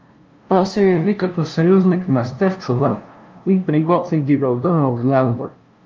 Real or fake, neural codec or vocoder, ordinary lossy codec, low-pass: fake; codec, 16 kHz, 0.5 kbps, FunCodec, trained on LibriTTS, 25 frames a second; Opus, 24 kbps; 7.2 kHz